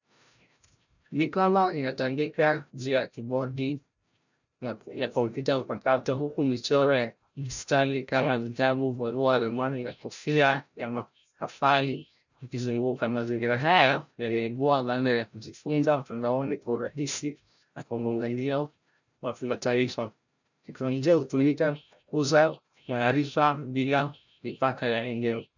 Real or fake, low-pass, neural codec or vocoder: fake; 7.2 kHz; codec, 16 kHz, 0.5 kbps, FreqCodec, larger model